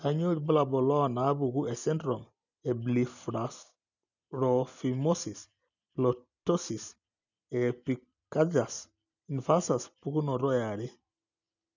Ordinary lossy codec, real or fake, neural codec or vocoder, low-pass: none; real; none; 7.2 kHz